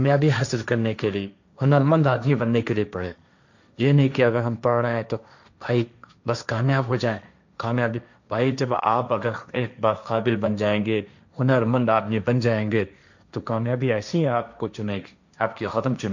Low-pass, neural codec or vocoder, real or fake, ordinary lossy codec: 7.2 kHz; codec, 16 kHz, 1.1 kbps, Voila-Tokenizer; fake; none